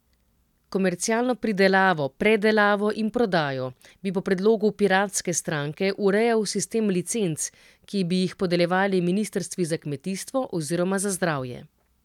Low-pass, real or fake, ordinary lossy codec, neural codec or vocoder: 19.8 kHz; real; none; none